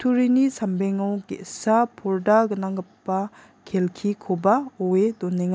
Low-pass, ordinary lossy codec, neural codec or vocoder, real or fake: none; none; none; real